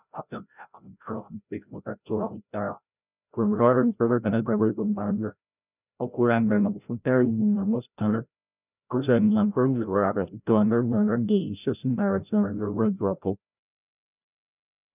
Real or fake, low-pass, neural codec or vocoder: fake; 3.6 kHz; codec, 16 kHz, 0.5 kbps, FreqCodec, larger model